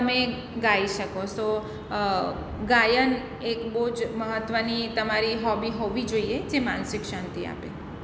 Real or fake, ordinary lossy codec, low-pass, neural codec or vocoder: real; none; none; none